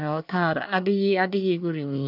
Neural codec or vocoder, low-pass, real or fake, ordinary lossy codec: codec, 24 kHz, 1 kbps, SNAC; 5.4 kHz; fake; none